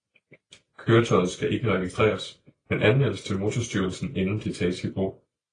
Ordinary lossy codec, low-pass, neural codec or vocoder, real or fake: AAC, 32 kbps; 9.9 kHz; none; real